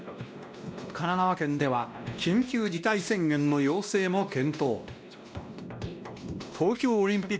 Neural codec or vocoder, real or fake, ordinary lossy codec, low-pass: codec, 16 kHz, 1 kbps, X-Codec, WavLM features, trained on Multilingual LibriSpeech; fake; none; none